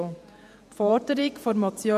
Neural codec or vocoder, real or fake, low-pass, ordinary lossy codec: vocoder, 48 kHz, 128 mel bands, Vocos; fake; 14.4 kHz; none